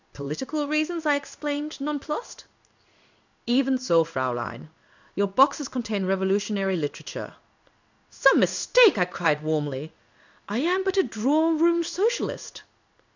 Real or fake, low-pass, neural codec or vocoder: fake; 7.2 kHz; codec, 16 kHz in and 24 kHz out, 1 kbps, XY-Tokenizer